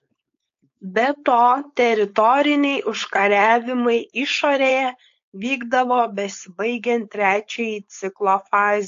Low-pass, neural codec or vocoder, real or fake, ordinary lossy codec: 7.2 kHz; codec, 16 kHz, 4.8 kbps, FACodec; fake; AAC, 48 kbps